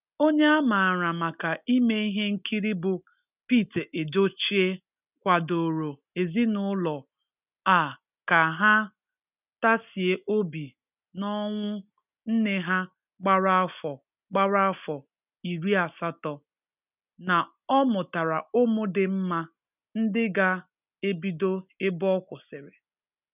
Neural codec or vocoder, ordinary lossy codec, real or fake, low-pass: none; none; real; 3.6 kHz